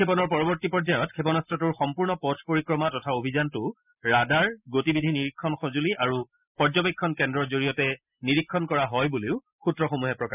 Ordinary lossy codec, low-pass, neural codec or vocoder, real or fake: none; 3.6 kHz; none; real